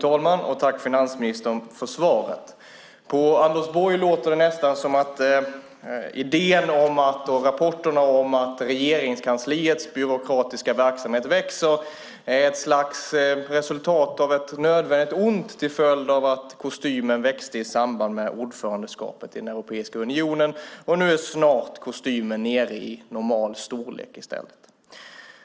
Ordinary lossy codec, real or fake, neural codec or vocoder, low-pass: none; real; none; none